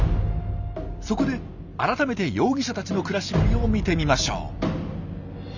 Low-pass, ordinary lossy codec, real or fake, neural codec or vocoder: 7.2 kHz; none; real; none